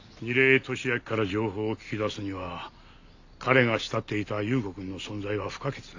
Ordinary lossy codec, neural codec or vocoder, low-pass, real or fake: AAC, 48 kbps; none; 7.2 kHz; real